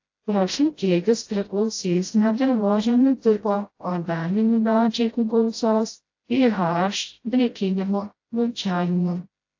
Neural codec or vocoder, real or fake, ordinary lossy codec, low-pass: codec, 16 kHz, 0.5 kbps, FreqCodec, smaller model; fake; AAC, 48 kbps; 7.2 kHz